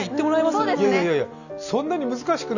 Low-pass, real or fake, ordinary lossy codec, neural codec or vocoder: 7.2 kHz; real; none; none